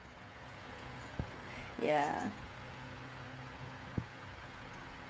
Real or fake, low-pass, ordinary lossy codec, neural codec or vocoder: fake; none; none; codec, 16 kHz, 16 kbps, FreqCodec, smaller model